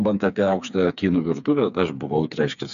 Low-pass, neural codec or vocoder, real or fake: 7.2 kHz; codec, 16 kHz, 4 kbps, FreqCodec, smaller model; fake